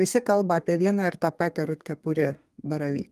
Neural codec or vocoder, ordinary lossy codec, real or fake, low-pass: codec, 32 kHz, 1.9 kbps, SNAC; Opus, 32 kbps; fake; 14.4 kHz